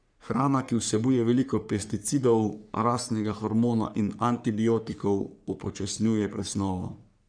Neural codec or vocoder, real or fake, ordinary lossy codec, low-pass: codec, 44.1 kHz, 3.4 kbps, Pupu-Codec; fake; none; 9.9 kHz